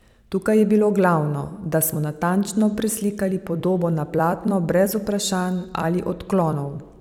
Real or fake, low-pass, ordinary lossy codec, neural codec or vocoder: fake; 19.8 kHz; none; vocoder, 44.1 kHz, 128 mel bands every 256 samples, BigVGAN v2